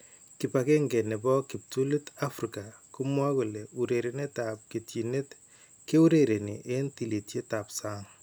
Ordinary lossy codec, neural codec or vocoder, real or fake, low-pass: none; none; real; none